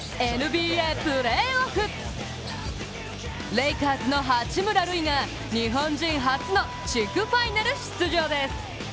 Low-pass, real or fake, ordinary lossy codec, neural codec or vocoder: none; real; none; none